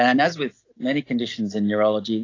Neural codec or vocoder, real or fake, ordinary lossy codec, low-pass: none; real; AAC, 32 kbps; 7.2 kHz